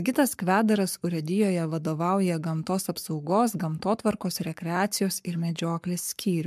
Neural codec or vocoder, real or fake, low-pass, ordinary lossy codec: codec, 44.1 kHz, 7.8 kbps, Pupu-Codec; fake; 14.4 kHz; MP3, 96 kbps